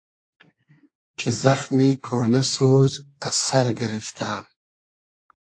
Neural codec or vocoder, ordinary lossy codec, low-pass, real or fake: codec, 24 kHz, 1 kbps, SNAC; AAC, 48 kbps; 9.9 kHz; fake